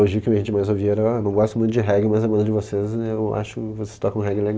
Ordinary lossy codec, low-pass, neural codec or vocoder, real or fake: none; none; none; real